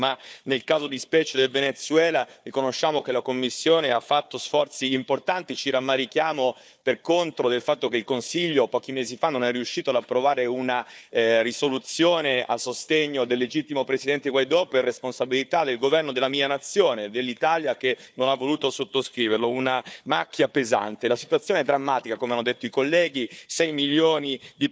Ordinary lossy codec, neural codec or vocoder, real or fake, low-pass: none; codec, 16 kHz, 4 kbps, FunCodec, trained on Chinese and English, 50 frames a second; fake; none